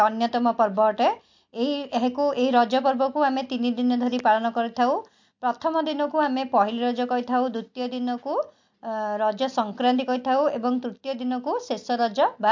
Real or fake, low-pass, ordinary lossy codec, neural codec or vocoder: real; 7.2 kHz; MP3, 48 kbps; none